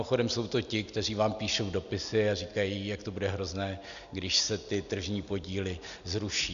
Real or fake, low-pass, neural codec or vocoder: real; 7.2 kHz; none